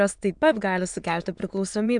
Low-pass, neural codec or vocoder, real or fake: 9.9 kHz; autoencoder, 22.05 kHz, a latent of 192 numbers a frame, VITS, trained on many speakers; fake